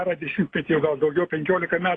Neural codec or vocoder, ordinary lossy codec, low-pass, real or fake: none; AAC, 32 kbps; 9.9 kHz; real